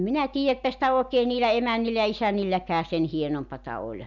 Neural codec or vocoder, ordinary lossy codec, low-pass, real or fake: none; none; 7.2 kHz; real